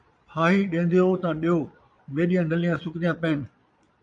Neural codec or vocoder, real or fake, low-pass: vocoder, 22.05 kHz, 80 mel bands, Vocos; fake; 9.9 kHz